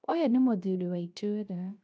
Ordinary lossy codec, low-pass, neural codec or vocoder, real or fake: none; none; codec, 16 kHz, 0.3 kbps, FocalCodec; fake